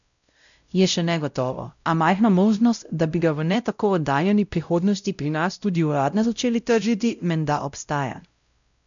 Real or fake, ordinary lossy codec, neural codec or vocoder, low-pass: fake; none; codec, 16 kHz, 0.5 kbps, X-Codec, WavLM features, trained on Multilingual LibriSpeech; 7.2 kHz